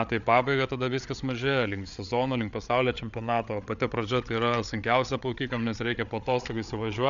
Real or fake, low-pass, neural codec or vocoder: fake; 7.2 kHz; codec, 16 kHz, 8 kbps, FreqCodec, larger model